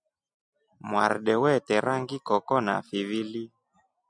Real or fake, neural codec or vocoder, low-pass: real; none; 9.9 kHz